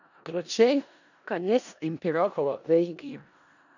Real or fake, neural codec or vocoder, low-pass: fake; codec, 16 kHz in and 24 kHz out, 0.4 kbps, LongCat-Audio-Codec, four codebook decoder; 7.2 kHz